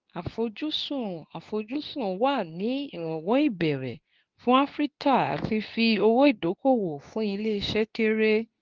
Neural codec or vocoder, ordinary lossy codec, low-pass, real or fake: codec, 24 kHz, 0.9 kbps, WavTokenizer, medium speech release version 2; Opus, 24 kbps; 7.2 kHz; fake